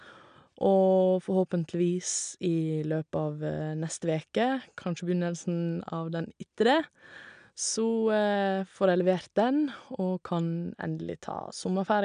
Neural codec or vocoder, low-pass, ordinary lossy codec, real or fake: none; 9.9 kHz; none; real